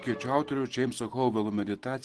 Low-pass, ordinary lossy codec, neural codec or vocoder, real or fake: 10.8 kHz; Opus, 16 kbps; none; real